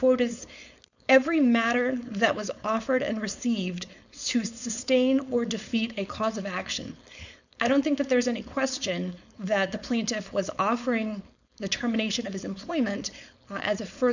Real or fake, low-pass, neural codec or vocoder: fake; 7.2 kHz; codec, 16 kHz, 4.8 kbps, FACodec